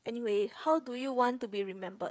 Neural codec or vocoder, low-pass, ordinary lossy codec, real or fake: codec, 16 kHz, 8 kbps, FreqCodec, smaller model; none; none; fake